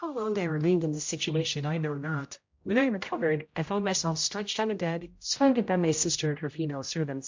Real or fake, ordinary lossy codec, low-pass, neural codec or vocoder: fake; MP3, 48 kbps; 7.2 kHz; codec, 16 kHz, 0.5 kbps, X-Codec, HuBERT features, trained on general audio